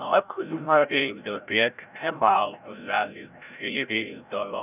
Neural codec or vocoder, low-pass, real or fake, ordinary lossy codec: codec, 16 kHz, 0.5 kbps, FreqCodec, larger model; 3.6 kHz; fake; none